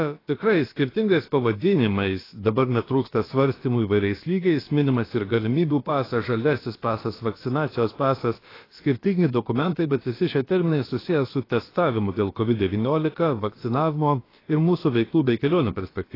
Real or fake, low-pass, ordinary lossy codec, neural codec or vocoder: fake; 5.4 kHz; AAC, 24 kbps; codec, 16 kHz, about 1 kbps, DyCAST, with the encoder's durations